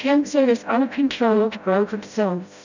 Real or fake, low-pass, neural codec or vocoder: fake; 7.2 kHz; codec, 16 kHz, 0.5 kbps, FreqCodec, smaller model